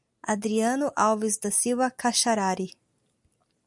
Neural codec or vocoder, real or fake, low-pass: none; real; 10.8 kHz